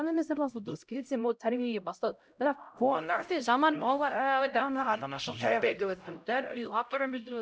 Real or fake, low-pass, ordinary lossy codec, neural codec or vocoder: fake; none; none; codec, 16 kHz, 0.5 kbps, X-Codec, HuBERT features, trained on LibriSpeech